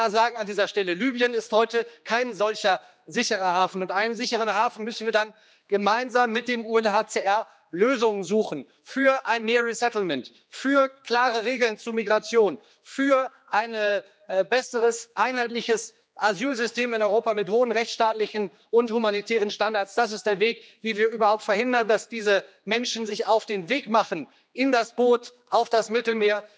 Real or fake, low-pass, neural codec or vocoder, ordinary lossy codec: fake; none; codec, 16 kHz, 2 kbps, X-Codec, HuBERT features, trained on general audio; none